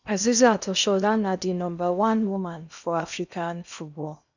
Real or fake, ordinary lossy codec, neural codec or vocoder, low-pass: fake; none; codec, 16 kHz in and 24 kHz out, 0.8 kbps, FocalCodec, streaming, 65536 codes; 7.2 kHz